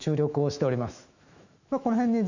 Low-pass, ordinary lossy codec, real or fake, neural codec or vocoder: 7.2 kHz; none; fake; autoencoder, 48 kHz, 128 numbers a frame, DAC-VAE, trained on Japanese speech